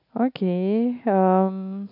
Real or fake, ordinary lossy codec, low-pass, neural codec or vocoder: real; none; 5.4 kHz; none